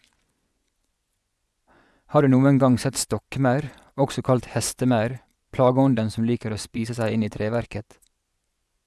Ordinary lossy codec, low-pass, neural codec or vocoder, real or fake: none; none; vocoder, 24 kHz, 100 mel bands, Vocos; fake